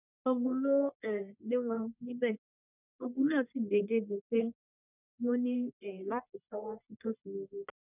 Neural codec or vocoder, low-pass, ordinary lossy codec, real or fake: codec, 44.1 kHz, 1.7 kbps, Pupu-Codec; 3.6 kHz; none; fake